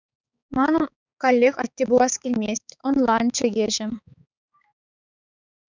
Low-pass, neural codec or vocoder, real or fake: 7.2 kHz; codec, 16 kHz, 4 kbps, X-Codec, HuBERT features, trained on balanced general audio; fake